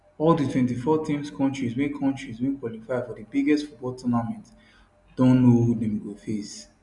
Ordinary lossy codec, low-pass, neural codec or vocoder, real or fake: none; 10.8 kHz; none; real